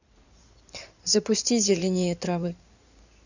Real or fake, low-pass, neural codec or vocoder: fake; 7.2 kHz; codec, 16 kHz in and 24 kHz out, 2.2 kbps, FireRedTTS-2 codec